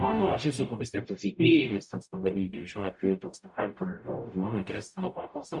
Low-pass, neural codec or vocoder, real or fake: 10.8 kHz; codec, 44.1 kHz, 0.9 kbps, DAC; fake